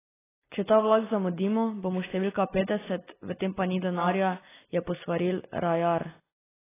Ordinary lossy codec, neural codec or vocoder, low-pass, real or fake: AAC, 16 kbps; none; 3.6 kHz; real